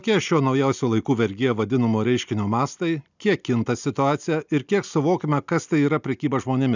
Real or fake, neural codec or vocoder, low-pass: real; none; 7.2 kHz